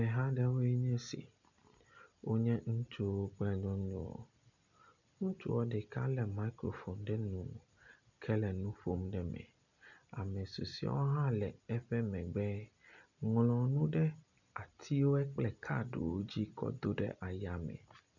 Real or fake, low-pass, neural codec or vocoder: real; 7.2 kHz; none